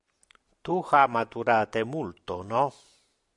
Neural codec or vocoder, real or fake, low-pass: none; real; 10.8 kHz